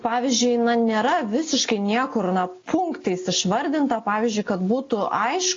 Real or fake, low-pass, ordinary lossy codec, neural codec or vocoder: real; 7.2 kHz; AAC, 32 kbps; none